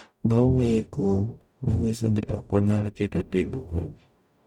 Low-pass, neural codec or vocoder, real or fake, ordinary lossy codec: 19.8 kHz; codec, 44.1 kHz, 0.9 kbps, DAC; fake; none